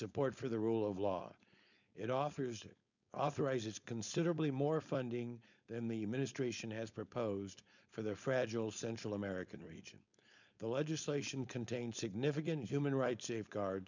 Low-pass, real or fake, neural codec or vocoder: 7.2 kHz; fake; codec, 16 kHz, 4.8 kbps, FACodec